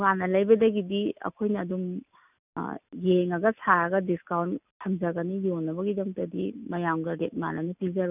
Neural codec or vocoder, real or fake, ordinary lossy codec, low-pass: none; real; none; 3.6 kHz